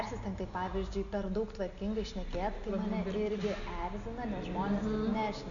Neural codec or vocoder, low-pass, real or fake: none; 7.2 kHz; real